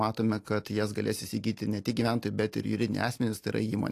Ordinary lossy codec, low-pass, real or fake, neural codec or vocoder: AAC, 64 kbps; 14.4 kHz; real; none